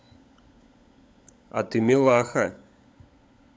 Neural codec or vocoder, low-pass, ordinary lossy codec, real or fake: codec, 16 kHz, 16 kbps, FunCodec, trained on LibriTTS, 50 frames a second; none; none; fake